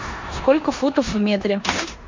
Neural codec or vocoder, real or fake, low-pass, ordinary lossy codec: codec, 16 kHz in and 24 kHz out, 0.9 kbps, LongCat-Audio-Codec, four codebook decoder; fake; 7.2 kHz; MP3, 48 kbps